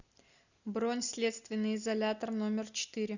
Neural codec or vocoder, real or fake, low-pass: none; real; 7.2 kHz